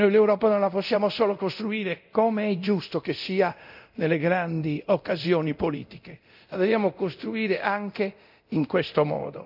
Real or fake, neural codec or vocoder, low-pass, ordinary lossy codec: fake; codec, 24 kHz, 0.9 kbps, DualCodec; 5.4 kHz; none